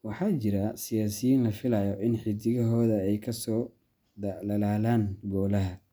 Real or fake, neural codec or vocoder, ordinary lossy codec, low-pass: real; none; none; none